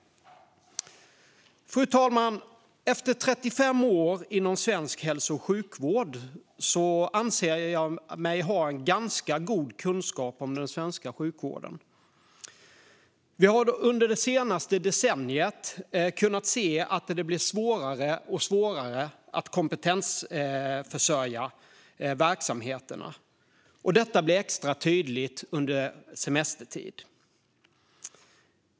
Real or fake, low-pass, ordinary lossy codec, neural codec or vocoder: real; none; none; none